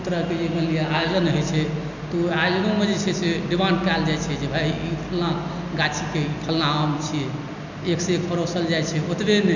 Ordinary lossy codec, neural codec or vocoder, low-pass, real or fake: none; none; 7.2 kHz; real